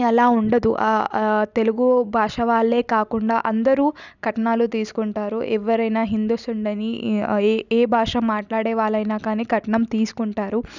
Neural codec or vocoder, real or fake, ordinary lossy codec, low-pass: none; real; none; 7.2 kHz